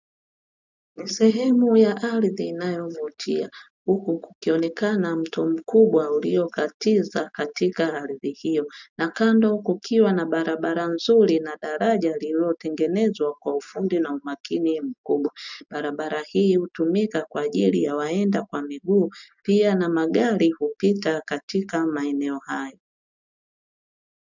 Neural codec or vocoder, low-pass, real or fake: none; 7.2 kHz; real